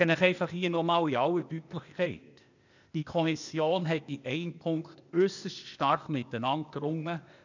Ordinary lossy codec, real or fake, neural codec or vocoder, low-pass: none; fake; codec, 16 kHz, 0.8 kbps, ZipCodec; 7.2 kHz